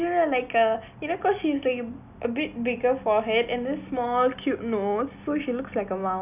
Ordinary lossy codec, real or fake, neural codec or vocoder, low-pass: none; real; none; 3.6 kHz